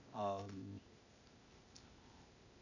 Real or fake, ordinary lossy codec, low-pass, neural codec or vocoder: fake; none; 7.2 kHz; codec, 16 kHz, 0.8 kbps, ZipCodec